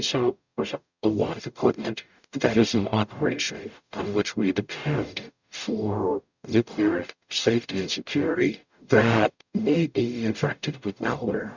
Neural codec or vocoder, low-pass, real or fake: codec, 44.1 kHz, 0.9 kbps, DAC; 7.2 kHz; fake